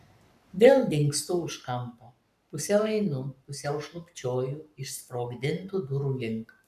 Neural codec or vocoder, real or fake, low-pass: codec, 44.1 kHz, 7.8 kbps, Pupu-Codec; fake; 14.4 kHz